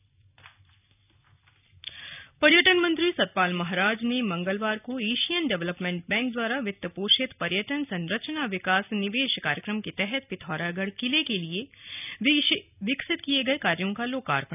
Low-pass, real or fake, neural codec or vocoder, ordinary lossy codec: 3.6 kHz; real; none; none